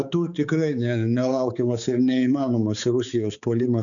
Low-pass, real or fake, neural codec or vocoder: 7.2 kHz; fake; codec, 16 kHz, 4 kbps, X-Codec, HuBERT features, trained on balanced general audio